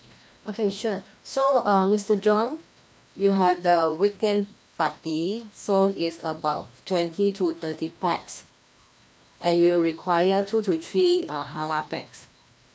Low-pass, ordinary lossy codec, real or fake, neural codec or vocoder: none; none; fake; codec, 16 kHz, 1 kbps, FreqCodec, larger model